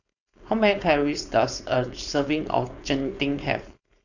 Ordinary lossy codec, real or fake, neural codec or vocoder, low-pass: none; fake; codec, 16 kHz, 4.8 kbps, FACodec; 7.2 kHz